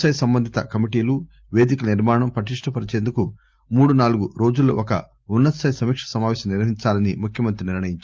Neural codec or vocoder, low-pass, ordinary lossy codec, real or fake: none; 7.2 kHz; Opus, 24 kbps; real